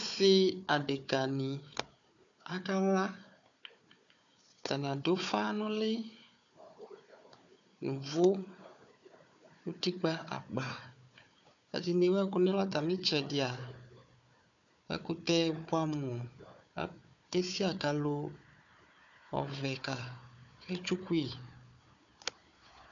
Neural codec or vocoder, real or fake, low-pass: codec, 16 kHz, 4 kbps, FunCodec, trained on Chinese and English, 50 frames a second; fake; 7.2 kHz